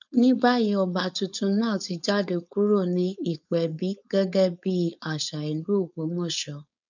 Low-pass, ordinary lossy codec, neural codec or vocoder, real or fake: 7.2 kHz; none; codec, 16 kHz, 4.8 kbps, FACodec; fake